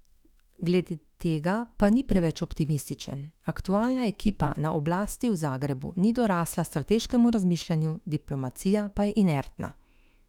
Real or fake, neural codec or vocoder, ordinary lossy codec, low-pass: fake; autoencoder, 48 kHz, 32 numbers a frame, DAC-VAE, trained on Japanese speech; none; 19.8 kHz